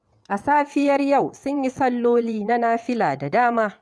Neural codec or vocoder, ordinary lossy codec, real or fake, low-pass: vocoder, 22.05 kHz, 80 mel bands, WaveNeXt; none; fake; none